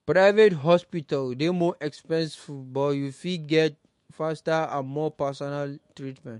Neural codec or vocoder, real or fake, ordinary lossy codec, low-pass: autoencoder, 48 kHz, 128 numbers a frame, DAC-VAE, trained on Japanese speech; fake; MP3, 48 kbps; 14.4 kHz